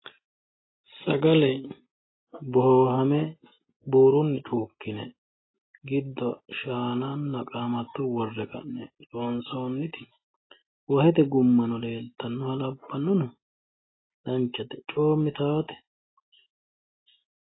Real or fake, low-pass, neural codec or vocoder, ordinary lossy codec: real; 7.2 kHz; none; AAC, 16 kbps